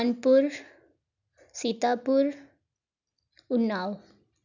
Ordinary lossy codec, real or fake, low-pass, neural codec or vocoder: none; real; 7.2 kHz; none